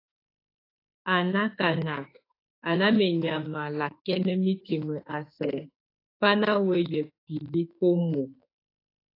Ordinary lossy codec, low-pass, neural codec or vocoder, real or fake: AAC, 24 kbps; 5.4 kHz; autoencoder, 48 kHz, 32 numbers a frame, DAC-VAE, trained on Japanese speech; fake